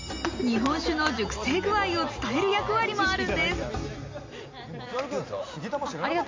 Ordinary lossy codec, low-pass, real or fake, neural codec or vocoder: MP3, 48 kbps; 7.2 kHz; real; none